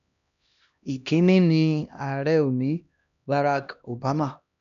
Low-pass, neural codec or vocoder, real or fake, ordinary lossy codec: 7.2 kHz; codec, 16 kHz, 1 kbps, X-Codec, HuBERT features, trained on LibriSpeech; fake; none